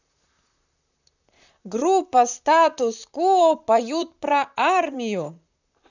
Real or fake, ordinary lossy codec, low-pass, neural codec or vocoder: fake; none; 7.2 kHz; vocoder, 44.1 kHz, 128 mel bands, Pupu-Vocoder